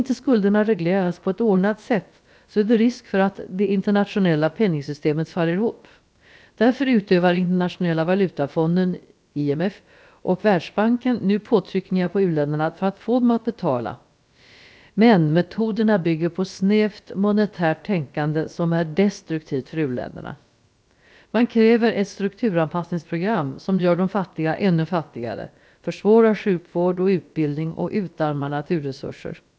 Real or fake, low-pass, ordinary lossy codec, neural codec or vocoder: fake; none; none; codec, 16 kHz, about 1 kbps, DyCAST, with the encoder's durations